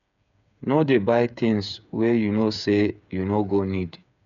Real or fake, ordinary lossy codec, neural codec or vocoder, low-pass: fake; none; codec, 16 kHz, 8 kbps, FreqCodec, smaller model; 7.2 kHz